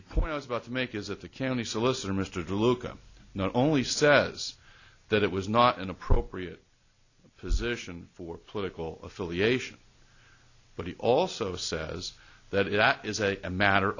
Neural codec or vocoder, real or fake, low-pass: none; real; 7.2 kHz